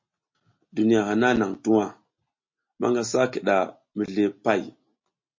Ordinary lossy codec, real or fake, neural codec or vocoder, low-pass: MP3, 32 kbps; real; none; 7.2 kHz